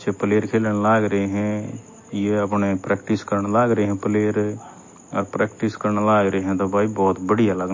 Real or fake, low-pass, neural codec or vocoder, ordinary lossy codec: real; 7.2 kHz; none; MP3, 32 kbps